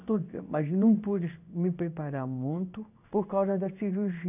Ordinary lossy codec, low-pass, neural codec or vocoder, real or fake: none; 3.6 kHz; codec, 16 kHz in and 24 kHz out, 1 kbps, XY-Tokenizer; fake